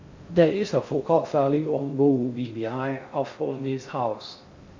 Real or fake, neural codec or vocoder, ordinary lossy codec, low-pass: fake; codec, 16 kHz in and 24 kHz out, 0.6 kbps, FocalCodec, streaming, 4096 codes; MP3, 48 kbps; 7.2 kHz